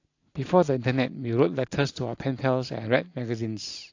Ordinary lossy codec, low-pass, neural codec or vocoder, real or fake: AAC, 48 kbps; 7.2 kHz; none; real